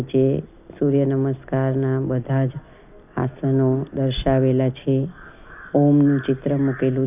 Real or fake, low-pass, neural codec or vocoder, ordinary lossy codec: real; 3.6 kHz; none; none